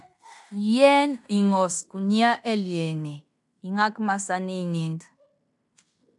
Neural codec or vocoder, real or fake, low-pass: codec, 16 kHz in and 24 kHz out, 0.9 kbps, LongCat-Audio-Codec, fine tuned four codebook decoder; fake; 10.8 kHz